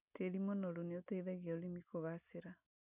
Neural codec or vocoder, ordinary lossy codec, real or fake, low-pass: none; none; real; 3.6 kHz